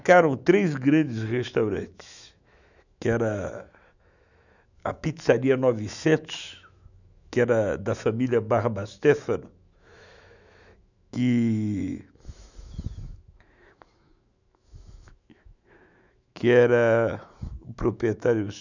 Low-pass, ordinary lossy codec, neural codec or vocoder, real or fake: 7.2 kHz; none; autoencoder, 48 kHz, 128 numbers a frame, DAC-VAE, trained on Japanese speech; fake